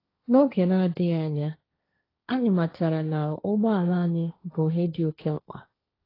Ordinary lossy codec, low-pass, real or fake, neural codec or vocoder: AAC, 24 kbps; 5.4 kHz; fake; codec, 16 kHz, 1.1 kbps, Voila-Tokenizer